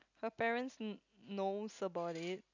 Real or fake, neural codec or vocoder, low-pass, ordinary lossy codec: real; none; 7.2 kHz; none